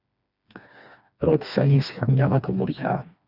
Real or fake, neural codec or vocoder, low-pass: fake; codec, 16 kHz, 2 kbps, FreqCodec, smaller model; 5.4 kHz